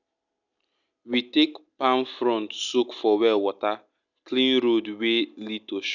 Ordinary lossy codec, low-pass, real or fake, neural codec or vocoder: none; 7.2 kHz; real; none